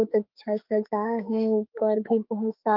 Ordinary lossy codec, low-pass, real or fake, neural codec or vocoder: Opus, 32 kbps; 5.4 kHz; fake; autoencoder, 48 kHz, 32 numbers a frame, DAC-VAE, trained on Japanese speech